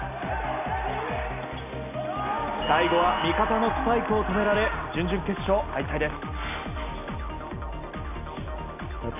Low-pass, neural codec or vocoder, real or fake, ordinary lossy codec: 3.6 kHz; none; real; none